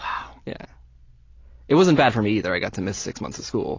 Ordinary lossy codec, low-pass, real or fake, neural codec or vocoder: AAC, 32 kbps; 7.2 kHz; real; none